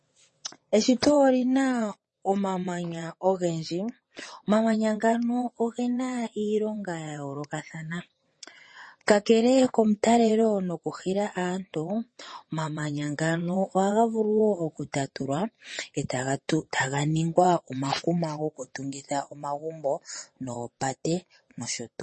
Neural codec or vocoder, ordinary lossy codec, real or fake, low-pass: vocoder, 22.05 kHz, 80 mel bands, WaveNeXt; MP3, 32 kbps; fake; 9.9 kHz